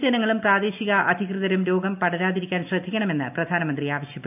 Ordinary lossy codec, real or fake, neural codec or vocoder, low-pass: none; real; none; 3.6 kHz